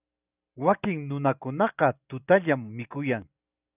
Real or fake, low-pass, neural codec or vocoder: real; 3.6 kHz; none